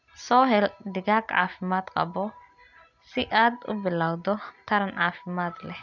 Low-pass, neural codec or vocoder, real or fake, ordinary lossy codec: 7.2 kHz; none; real; none